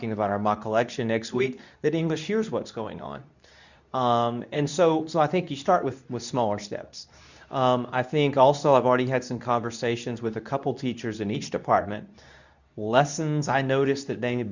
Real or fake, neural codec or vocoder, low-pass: fake; codec, 24 kHz, 0.9 kbps, WavTokenizer, medium speech release version 2; 7.2 kHz